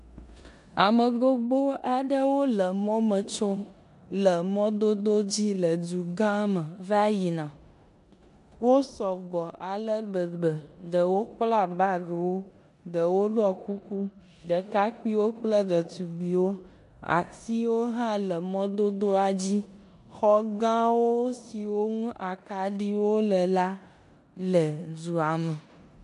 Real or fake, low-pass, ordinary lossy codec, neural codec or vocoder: fake; 10.8 kHz; MP3, 64 kbps; codec, 16 kHz in and 24 kHz out, 0.9 kbps, LongCat-Audio-Codec, four codebook decoder